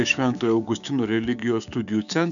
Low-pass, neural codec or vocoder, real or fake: 7.2 kHz; codec, 16 kHz, 6 kbps, DAC; fake